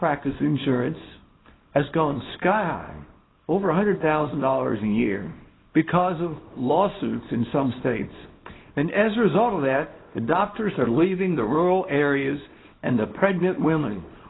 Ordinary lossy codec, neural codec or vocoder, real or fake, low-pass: AAC, 16 kbps; codec, 24 kHz, 0.9 kbps, WavTokenizer, small release; fake; 7.2 kHz